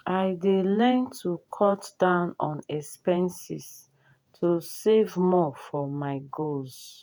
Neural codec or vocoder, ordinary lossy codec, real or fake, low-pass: vocoder, 48 kHz, 128 mel bands, Vocos; none; fake; none